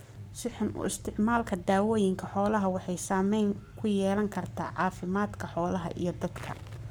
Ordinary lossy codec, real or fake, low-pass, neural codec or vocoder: none; fake; none; codec, 44.1 kHz, 7.8 kbps, Pupu-Codec